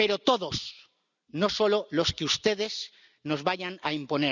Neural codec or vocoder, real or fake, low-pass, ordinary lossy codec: none; real; 7.2 kHz; none